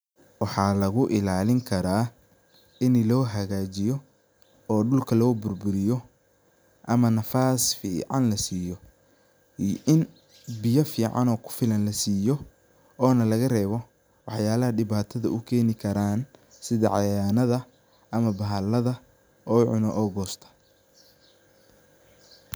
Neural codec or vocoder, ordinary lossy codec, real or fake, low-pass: none; none; real; none